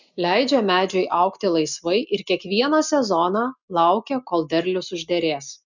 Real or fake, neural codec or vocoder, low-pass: real; none; 7.2 kHz